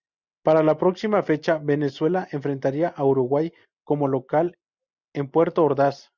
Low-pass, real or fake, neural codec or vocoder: 7.2 kHz; real; none